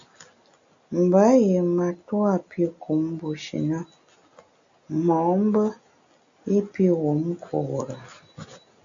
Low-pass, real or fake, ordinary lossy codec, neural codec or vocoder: 7.2 kHz; real; AAC, 64 kbps; none